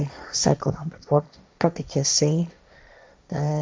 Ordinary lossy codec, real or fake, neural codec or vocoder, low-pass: none; fake; codec, 16 kHz, 1.1 kbps, Voila-Tokenizer; none